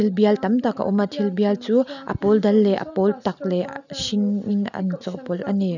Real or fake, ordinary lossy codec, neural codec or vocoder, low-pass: real; none; none; 7.2 kHz